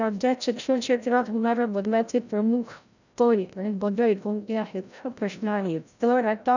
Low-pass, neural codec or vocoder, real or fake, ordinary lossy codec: 7.2 kHz; codec, 16 kHz, 0.5 kbps, FreqCodec, larger model; fake; none